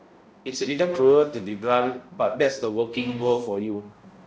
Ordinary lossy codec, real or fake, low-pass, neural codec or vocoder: none; fake; none; codec, 16 kHz, 0.5 kbps, X-Codec, HuBERT features, trained on balanced general audio